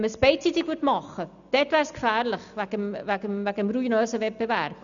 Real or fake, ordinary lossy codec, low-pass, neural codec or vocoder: real; none; 7.2 kHz; none